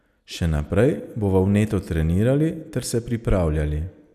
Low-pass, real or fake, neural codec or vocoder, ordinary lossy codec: 14.4 kHz; real; none; none